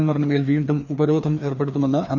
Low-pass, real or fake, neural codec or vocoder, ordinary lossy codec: 7.2 kHz; fake; codec, 16 kHz, 2 kbps, FreqCodec, larger model; none